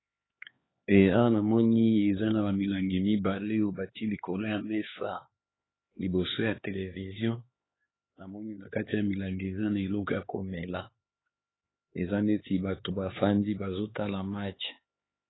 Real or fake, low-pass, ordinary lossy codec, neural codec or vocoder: fake; 7.2 kHz; AAC, 16 kbps; codec, 16 kHz, 4 kbps, X-Codec, HuBERT features, trained on LibriSpeech